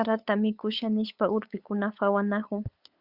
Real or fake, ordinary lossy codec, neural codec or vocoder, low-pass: fake; Opus, 64 kbps; codec, 16 kHz, 8 kbps, FunCodec, trained on LibriTTS, 25 frames a second; 5.4 kHz